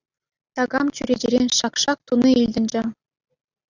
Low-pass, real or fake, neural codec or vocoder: 7.2 kHz; real; none